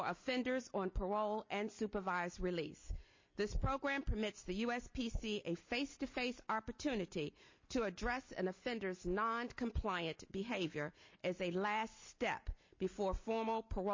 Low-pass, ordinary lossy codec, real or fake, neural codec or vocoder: 7.2 kHz; MP3, 32 kbps; real; none